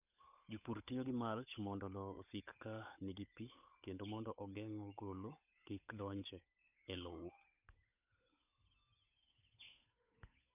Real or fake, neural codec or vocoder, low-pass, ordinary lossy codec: fake; codec, 16 kHz, 8 kbps, FunCodec, trained on Chinese and English, 25 frames a second; 3.6 kHz; none